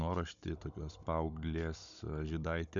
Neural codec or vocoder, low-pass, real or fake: codec, 16 kHz, 16 kbps, FunCodec, trained on Chinese and English, 50 frames a second; 7.2 kHz; fake